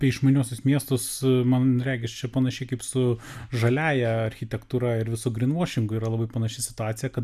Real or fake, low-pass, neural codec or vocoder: real; 14.4 kHz; none